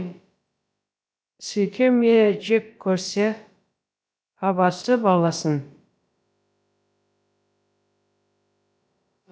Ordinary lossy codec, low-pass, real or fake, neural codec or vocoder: none; none; fake; codec, 16 kHz, about 1 kbps, DyCAST, with the encoder's durations